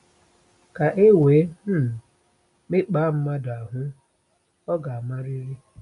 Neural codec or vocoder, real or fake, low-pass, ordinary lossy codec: none; real; 10.8 kHz; none